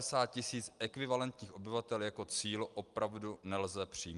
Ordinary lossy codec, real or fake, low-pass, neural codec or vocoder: Opus, 32 kbps; real; 10.8 kHz; none